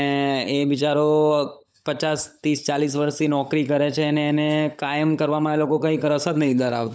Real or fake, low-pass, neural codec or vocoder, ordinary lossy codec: fake; none; codec, 16 kHz, 16 kbps, FunCodec, trained on LibriTTS, 50 frames a second; none